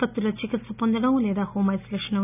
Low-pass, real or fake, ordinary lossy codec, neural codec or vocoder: 3.6 kHz; real; none; none